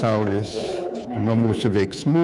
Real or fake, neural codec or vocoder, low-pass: fake; codec, 24 kHz, 3.1 kbps, DualCodec; 10.8 kHz